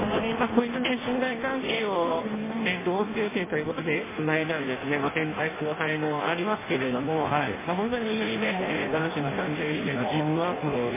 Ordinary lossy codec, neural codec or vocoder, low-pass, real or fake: MP3, 16 kbps; codec, 16 kHz in and 24 kHz out, 0.6 kbps, FireRedTTS-2 codec; 3.6 kHz; fake